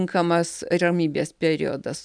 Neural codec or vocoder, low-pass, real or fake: none; 9.9 kHz; real